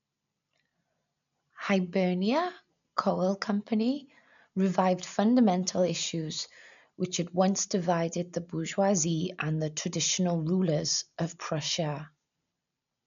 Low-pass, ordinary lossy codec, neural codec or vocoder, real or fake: 7.2 kHz; none; none; real